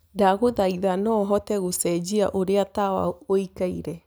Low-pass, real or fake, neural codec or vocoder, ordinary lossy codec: none; real; none; none